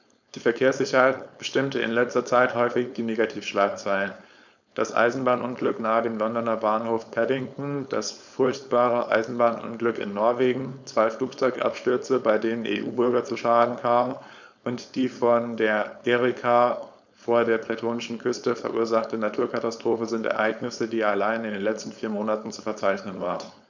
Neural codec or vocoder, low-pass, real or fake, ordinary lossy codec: codec, 16 kHz, 4.8 kbps, FACodec; 7.2 kHz; fake; none